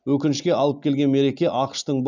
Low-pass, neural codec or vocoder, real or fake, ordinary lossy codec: 7.2 kHz; none; real; none